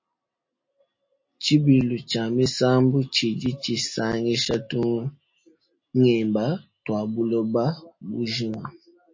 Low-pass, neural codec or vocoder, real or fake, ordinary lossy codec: 7.2 kHz; none; real; MP3, 32 kbps